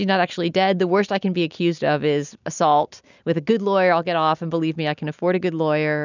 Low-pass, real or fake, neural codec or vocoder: 7.2 kHz; real; none